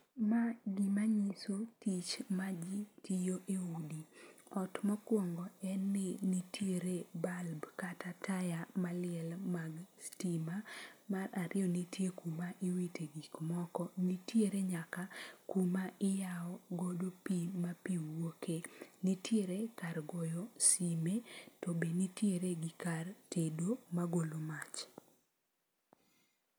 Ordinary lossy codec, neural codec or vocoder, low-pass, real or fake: none; none; none; real